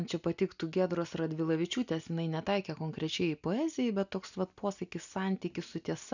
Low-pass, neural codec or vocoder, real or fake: 7.2 kHz; none; real